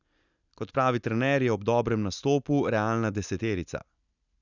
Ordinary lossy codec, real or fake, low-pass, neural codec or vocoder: none; real; 7.2 kHz; none